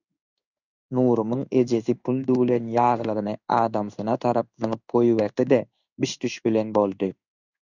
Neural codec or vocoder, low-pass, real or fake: codec, 16 kHz in and 24 kHz out, 1 kbps, XY-Tokenizer; 7.2 kHz; fake